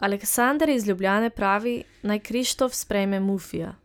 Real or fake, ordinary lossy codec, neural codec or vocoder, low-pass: real; none; none; none